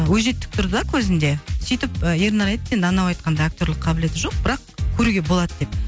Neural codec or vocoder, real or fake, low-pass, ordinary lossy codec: none; real; none; none